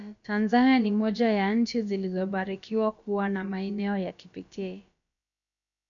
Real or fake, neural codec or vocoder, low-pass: fake; codec, 16 kHz, about 1 kbps, DyCAST, with the encoder's durations; 7.2 kHz